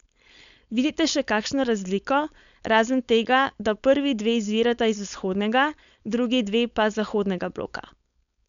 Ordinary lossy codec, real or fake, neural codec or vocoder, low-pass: none; fake; codec, 16 kHz, 4.8 kbps, FACodec; 7.2 kHz